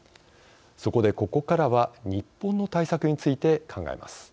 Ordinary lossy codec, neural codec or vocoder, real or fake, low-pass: none; none; real; none